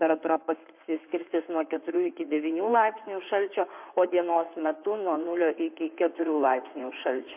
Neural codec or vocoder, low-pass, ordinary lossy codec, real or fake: codec, 16 kHz in and 24 kHz out, 2.2 kbps, FireRedTTS-2 codec; 3.6 kHz; MP3, 32 kbps; fake